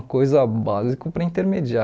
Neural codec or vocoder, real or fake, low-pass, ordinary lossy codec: none; real; none; none